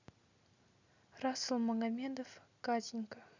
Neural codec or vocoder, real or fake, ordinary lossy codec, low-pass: none; real; none; 7.2 kHz